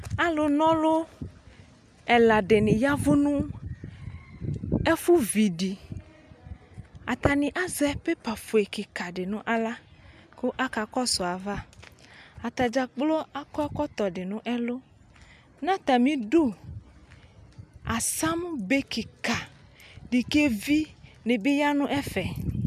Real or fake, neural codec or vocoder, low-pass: real; none; 14.4 kHz